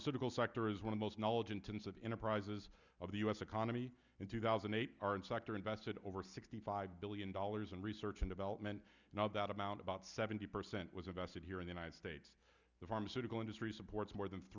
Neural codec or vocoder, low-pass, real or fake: none; 7.2 kHz; real